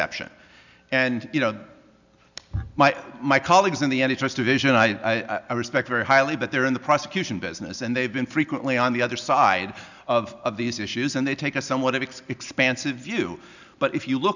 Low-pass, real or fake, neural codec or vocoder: 7.2 kHz; real; none